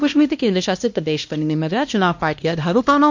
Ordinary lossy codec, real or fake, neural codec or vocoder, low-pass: MP3, 48 kbps; fake; codec, 16 kHz, 1 kbps, X-Codec, HuBERT features, trained on LibriSpeech; 7.2 kHz